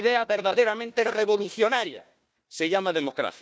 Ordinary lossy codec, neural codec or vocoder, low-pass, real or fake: none; codec, 16 kHz, 1 kbps, FunCodec, trained on Chinese and English, 50 frames a second; none; fake